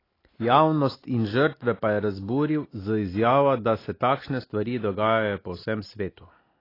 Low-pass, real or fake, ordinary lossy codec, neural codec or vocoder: 5.4 kHz; real; AAC, 24 kbps; none